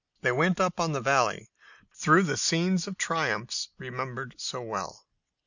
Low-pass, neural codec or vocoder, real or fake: 7.2 kHz; none; real